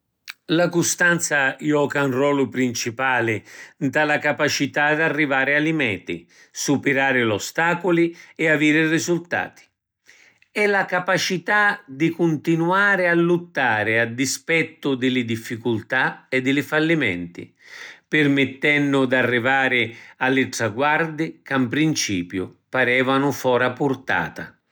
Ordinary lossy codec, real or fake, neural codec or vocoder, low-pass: none; real; none; none